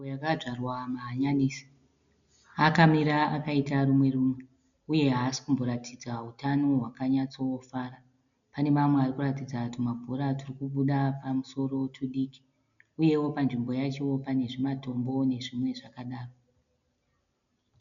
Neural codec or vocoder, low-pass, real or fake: none; 7.2 kHz; real